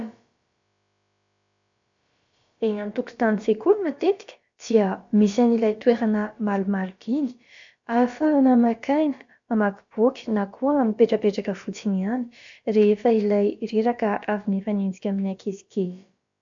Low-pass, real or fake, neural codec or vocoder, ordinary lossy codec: 7.2 kHz; fake; codec, 16 kHz, about 1 kbps, DyCAST, with the encoder's durations; MP3, 64 kbps